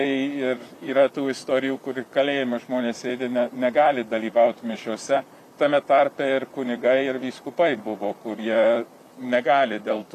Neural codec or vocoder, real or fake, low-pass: vocoder, 44.1 kHz, 128 mel bands, Pupu-Vocoder; fake; 14.4 kHz